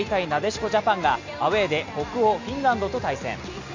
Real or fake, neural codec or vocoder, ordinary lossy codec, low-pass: real; none; AAC, 48 kbps; 7.2 kHz